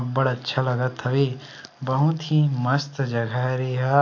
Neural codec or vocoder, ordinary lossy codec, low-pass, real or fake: none; AAC, 48 kbps; 7.2 kHz; real